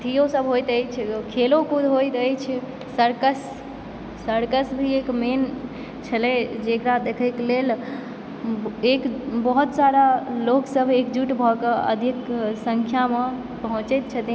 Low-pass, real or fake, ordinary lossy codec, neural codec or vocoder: none; real; none; none